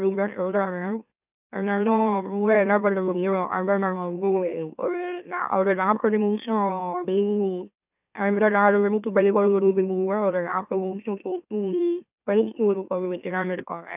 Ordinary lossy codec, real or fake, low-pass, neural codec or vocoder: none; fake; 3.6 kHz; autoencoder, 44.1 kHz, a latent of 192 numbers a frame, MeloTTS